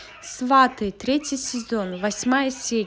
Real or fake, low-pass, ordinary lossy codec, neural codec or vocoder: real; none; none; none